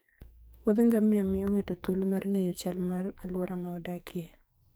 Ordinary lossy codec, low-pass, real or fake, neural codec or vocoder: none; none; fake; codec, 44.1 kHz, 2.6 kbps, SNAC